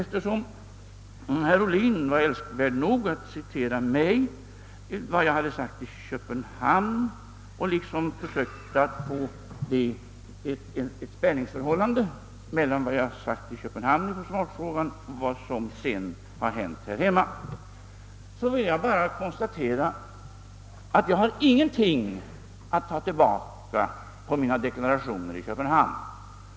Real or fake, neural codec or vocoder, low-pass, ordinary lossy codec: real; none; none; none